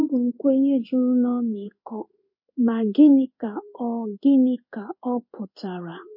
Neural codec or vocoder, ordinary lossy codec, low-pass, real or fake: codec, 16 kHz in and 24 kHz out, 1 kbps, XY-Tokenizer; MP3, 32 kbps; 5.4 kHz; fake